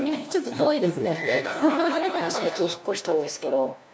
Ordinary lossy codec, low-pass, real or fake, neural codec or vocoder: none; none; fake; codec, 16 kHz, 1 kbps, FunCodec, trained on LibriTTS, 50 frames a second